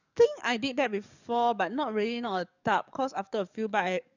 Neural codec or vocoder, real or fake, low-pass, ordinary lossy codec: codec, 44.1 kHz, 7.8 kbps, DAC; fake; 7.2 kHz; none